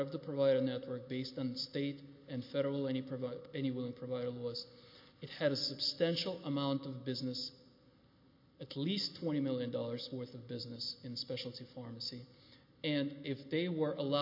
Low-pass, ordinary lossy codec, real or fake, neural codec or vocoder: 5.4 kHz; MP3, 32 kbps; real; none